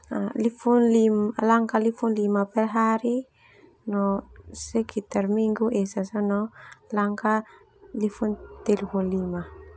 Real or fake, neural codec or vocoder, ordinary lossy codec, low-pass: real; none; none; none